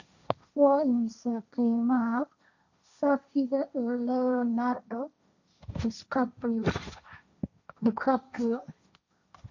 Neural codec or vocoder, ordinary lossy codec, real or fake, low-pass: codec, 16 kHz, 1.1 kbps, Voila-Tokenizer; none; fake; 7.2 kHz